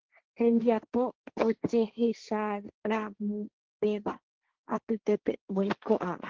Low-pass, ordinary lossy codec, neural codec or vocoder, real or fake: 7.2 kHz; Opus, 16 kbps; codec, 16 kHz, 1.1 kbps, Voila-Tokenizer; fake